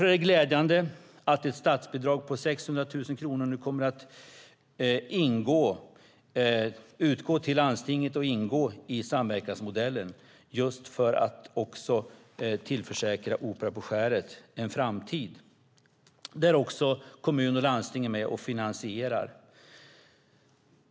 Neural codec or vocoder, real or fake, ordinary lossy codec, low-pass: none; real; none; none